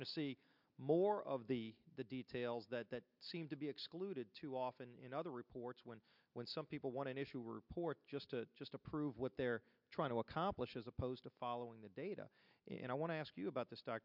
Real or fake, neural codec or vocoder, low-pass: real; none; 5.4 kHz